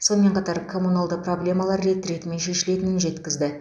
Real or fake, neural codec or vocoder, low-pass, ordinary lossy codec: real; none; none; none